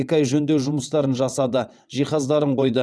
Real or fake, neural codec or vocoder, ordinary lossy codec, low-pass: fake; vocoder, 22.05 kHz, 80 mel bands, WaveNeXt; none; none